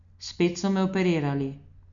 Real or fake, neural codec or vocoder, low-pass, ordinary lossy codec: real; none; 7.2 kHz; none